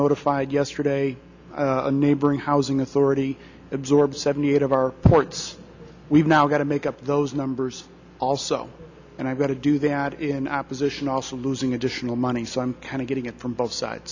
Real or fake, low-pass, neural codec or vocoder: real; 7.2 kHz; none